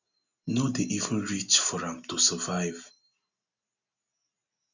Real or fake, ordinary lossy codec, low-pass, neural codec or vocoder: real; none; 7.2 kHz; none